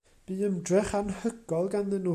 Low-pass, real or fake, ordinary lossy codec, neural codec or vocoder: 14.4 kHz; real; AAC, 96 kbps; none